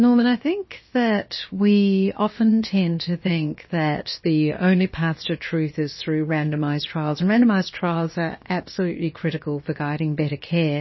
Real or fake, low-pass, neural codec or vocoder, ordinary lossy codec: fake; 7.2 kHz; codec, 16 kHz, about 1 kbps, DyCAST, with the encoder's durations; MP3, 24 kbps